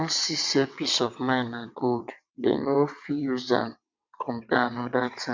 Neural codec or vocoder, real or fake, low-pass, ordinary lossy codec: vocoder, 22.05 kHz, 80 mel bands, WaveNeXt; fake; 7.2 kHz; MP3, 64 kbps